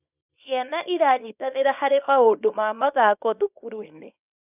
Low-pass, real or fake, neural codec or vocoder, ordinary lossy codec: 3.6 kHz; fake; codec, 24 kHz, 0.9 kbps, WavTokenizer, small release; none